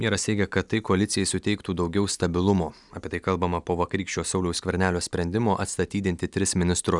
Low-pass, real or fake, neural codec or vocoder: 10.8 kHz; real; none